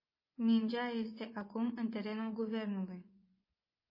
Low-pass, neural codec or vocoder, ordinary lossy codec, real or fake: 5.4 kHz; vocoder, 24 kHz, 100 mel bands, Vocos; MP3, 24 kbps; fake